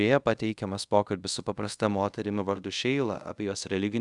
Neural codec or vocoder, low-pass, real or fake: codec, 24 kHz, 0.5 kbps, DualCodec; 10.8 kHz; fake